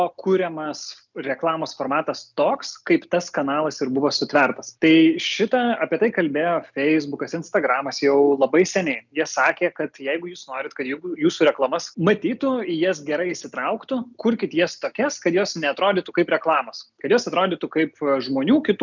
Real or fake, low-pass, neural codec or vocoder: real; 7.2 kHz; none